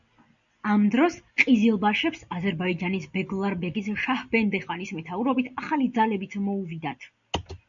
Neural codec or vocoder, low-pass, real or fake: none; 7.2 kHz; real